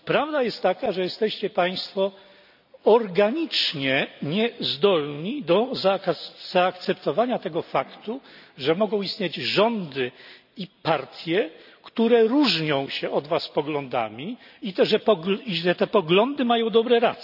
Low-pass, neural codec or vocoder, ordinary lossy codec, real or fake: 5.4 kHz; none; none; real